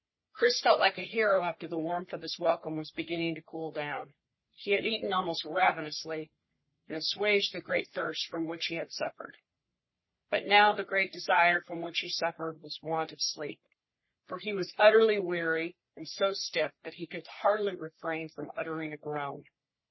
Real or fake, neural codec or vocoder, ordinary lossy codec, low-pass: fake; codec, 44.1 kHz, 3.4 kbps, Pupu-Codec; MP3, 24 kbps; 7.2 kHz